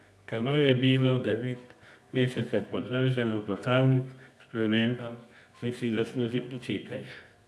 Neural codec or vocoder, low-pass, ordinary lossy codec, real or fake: codec, 24 kHz, 0.9 kbps, WavTokenizer, medium music audio release; none; none; fake